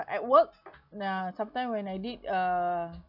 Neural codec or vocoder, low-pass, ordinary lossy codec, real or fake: none; 5.4 kHz; none; real